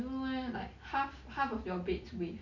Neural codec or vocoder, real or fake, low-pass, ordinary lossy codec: none; real; 7.2 kHz; none